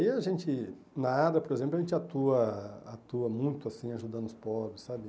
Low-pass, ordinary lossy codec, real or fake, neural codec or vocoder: none; none; real; none